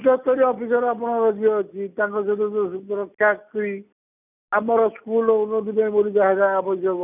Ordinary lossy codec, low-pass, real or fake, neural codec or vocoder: none; 3.6 kHz; real; none